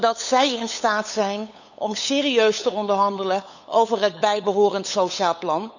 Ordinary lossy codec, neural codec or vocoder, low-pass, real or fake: none; codec, 16 kHz, 8 kbps, FunCodec, trained on LibriTTS, 25 frames a second; 7.2 kHz; fake